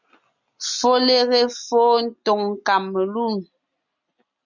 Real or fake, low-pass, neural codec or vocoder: real; 7.2 kHz; none